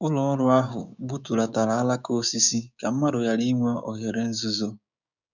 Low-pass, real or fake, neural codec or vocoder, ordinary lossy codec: 7.2 kHz; fake; codec, 16 kHz, 6 kbps, DAC; none